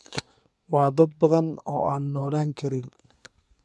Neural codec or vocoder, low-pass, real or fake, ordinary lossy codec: codec, 24 kHz, 1 kbps, SNAC; none; fake; none